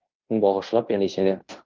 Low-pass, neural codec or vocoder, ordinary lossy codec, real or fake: 7.2 kHz; codec, 16 kHz in and 24 kHz out, 0.9 kbps, LongCat-Audio-Codec, fine tuned four codebook decoder; Opus, 32 kbps; fake